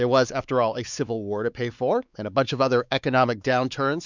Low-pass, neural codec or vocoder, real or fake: 7.2 kHz; codec, 16 kHz, 4 kbps, X-Codec, WavLM features, trained on Multilingual LibriSpeech; fake